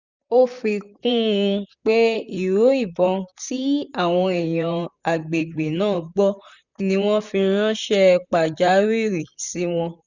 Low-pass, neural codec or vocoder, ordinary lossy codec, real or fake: 7.2 kHz; vocoder, 44.1 kHz, 128 mel bands, Pupu-Vocoder; none; fake